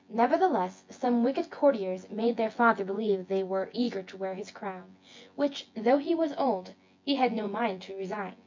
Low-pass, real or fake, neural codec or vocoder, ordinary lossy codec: 7.2 kHz; fake; vocoder, 24 kHz, 100 mel bands, Vocos; MP3, 48 kbps